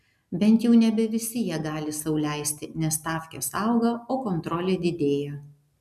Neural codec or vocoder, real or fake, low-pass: none; real; 14.4 kHz